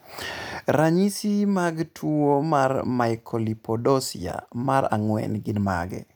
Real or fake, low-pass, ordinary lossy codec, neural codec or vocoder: real; none; none; none